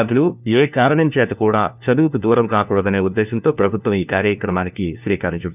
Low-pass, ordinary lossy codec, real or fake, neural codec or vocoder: 3.6 kHz; none; fake; codec, 16 kHz, 1 kbps, FunCodec, trained on LibriTTS, 50 frames a second